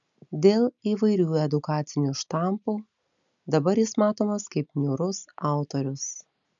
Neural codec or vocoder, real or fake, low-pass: none; real; 7.2 kHz